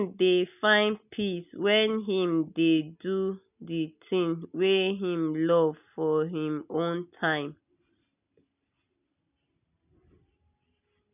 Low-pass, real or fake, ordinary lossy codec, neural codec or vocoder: 3.6 kHz; real; none; none